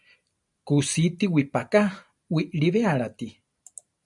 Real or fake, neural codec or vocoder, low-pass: real; none; 10.8 kHz